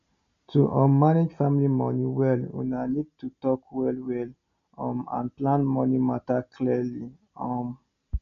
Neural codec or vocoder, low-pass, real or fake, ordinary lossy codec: none; 7.2 kHz; real; none